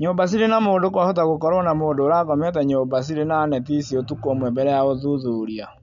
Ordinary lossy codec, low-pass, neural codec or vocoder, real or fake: none; 7.2 kHz; none; real